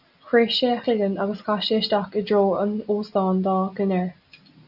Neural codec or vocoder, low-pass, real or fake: none; 5.4 kHz; real